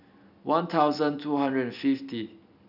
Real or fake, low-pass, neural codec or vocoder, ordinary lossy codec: real; 5.4 kHz; none; none